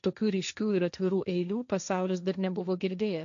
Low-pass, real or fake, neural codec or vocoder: 7.2 kHz; fake; codec, 16 kHz, 1.1 kbps, Voila-Tokenizer